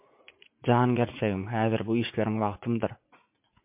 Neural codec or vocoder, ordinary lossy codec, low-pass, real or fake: vocoder, 44.1 kHz, 80 mel bands, Vocos; MP3, 32 kbps; 3.6 kHz; fake